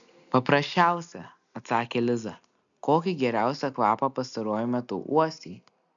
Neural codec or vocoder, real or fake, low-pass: none; real; 7.2 kHz